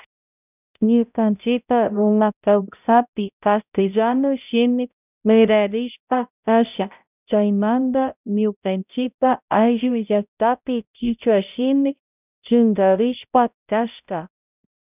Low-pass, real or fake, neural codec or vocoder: 3.6 kHz; fake; codec, 16 kHz, 0.5 kbps, X-Codec, HuBERT features, trained on balanced general audio